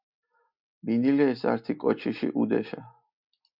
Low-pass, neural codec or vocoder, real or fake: 5.4 kHz; none; real